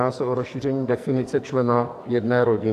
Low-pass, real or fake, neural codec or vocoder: 14.4 kHz; fake; codec, 44.1 kHz, 2.6 kbps, SNAC